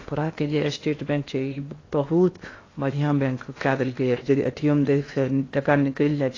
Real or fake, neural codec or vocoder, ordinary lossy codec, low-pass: fake; codec, 16 kHz in and 24 kHz out, 0.8 kbps, FocalCodec, streaming, 65536 codes; AAC, 32 kbps; 7.2 kHz